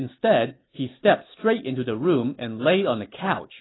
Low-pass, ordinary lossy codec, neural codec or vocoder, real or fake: 7.2 kHz; AAC, 16 kbps; none; real